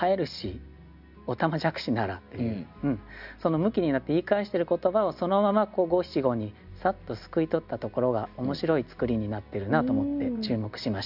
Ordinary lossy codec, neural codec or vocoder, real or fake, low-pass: none; none; real; 5.4 kHz